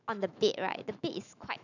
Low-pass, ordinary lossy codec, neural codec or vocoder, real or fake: 7.2 kHz; none; autoencoder, 48 kHz, 128 numbers a frame, DAC-VAE, trained on Japanese speech; fake